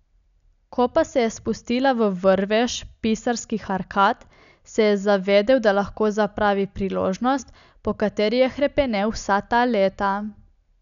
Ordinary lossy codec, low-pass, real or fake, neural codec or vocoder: none; 7.2 kHz; real; none